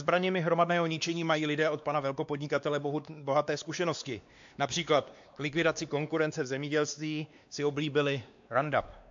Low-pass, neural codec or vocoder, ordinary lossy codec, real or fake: 7.2 kHz; codec, 16 kHz, 2 kbps, X-Codec, WavLM features, trained on Multilingual LibriSpeech; AAC, 64 kbps; fake